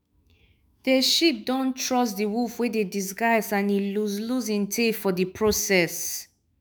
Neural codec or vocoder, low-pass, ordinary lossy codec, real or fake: autoencoder, 48 kHz, 128 numbers a frame, DAC-VAE, trained on Japanese speech; none; none; fake